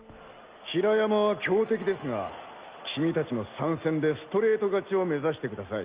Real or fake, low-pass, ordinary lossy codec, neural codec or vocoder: real; 3.6 kHz; Opus, 24 kbps; none